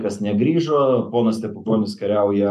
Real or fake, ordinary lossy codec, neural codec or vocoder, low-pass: real; MP3, 96 kbps; none; 14.4 kHz